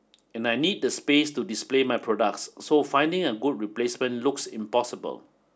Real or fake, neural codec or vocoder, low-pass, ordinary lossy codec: real; none; none; none